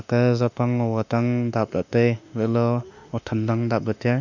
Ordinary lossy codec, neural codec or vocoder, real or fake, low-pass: none; autoencoder, 48 kHz, 32 numbers a frame, DAC-VAE, trained on Japanese speech; fake; 7.2 kHz